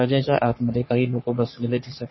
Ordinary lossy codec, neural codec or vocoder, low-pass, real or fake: MP3, 24 kbps; codec, 44.1 kHz, 3.4 kbps, Pupu-Codec; 7.2 kHz; fake